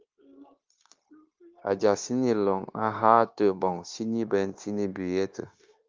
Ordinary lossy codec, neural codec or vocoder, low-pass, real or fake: Opus, 32 kbps; codec, 16 kHz, 0.9 kbps, LongCat-Audio-Codec; 7.2 kHz; fake